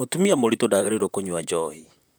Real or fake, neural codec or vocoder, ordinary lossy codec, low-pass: fake; vocoder, 44.1 kHz, 128 mel bands, Pupu-Vocoder; none; none